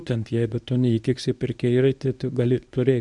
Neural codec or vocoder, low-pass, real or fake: codec, 24 kHz, 0.9 kbps, WavTokenizer, medium speech release version 1; 10.8 kHz; fake